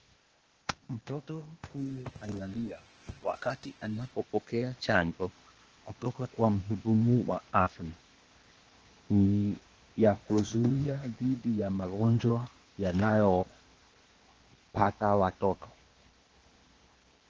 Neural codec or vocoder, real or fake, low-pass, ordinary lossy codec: codec, 16 kHz, 0.8 kbps, ZipCodec; fake; 7.2 kHz; Opus, 16 kbps